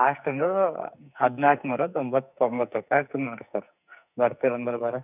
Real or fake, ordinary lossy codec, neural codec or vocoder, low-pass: fake; none; codec, 44.1 kHz, 2.6 kbps, SNAC; 3.6 kHz